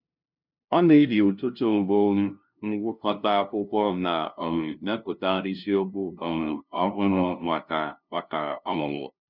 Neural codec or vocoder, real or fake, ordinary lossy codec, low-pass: codec, 16 kHz, 0.5 kbps, FunCodec, trained on LibriTTS, 25 frames a second; fake; none; 5.4 kHz